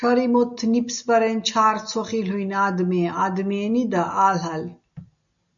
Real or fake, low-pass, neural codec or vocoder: real; 7.2 kHz; none